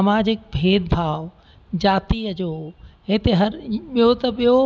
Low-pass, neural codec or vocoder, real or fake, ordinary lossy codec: none; none; real; none